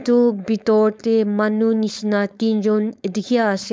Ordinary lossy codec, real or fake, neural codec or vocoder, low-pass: none; fake; codec, 16 kHz, 4.8 kbps, FACodec; none